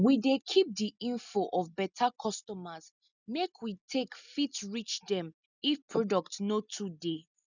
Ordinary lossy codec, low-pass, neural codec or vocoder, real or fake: none; 7.2 kHz; none; real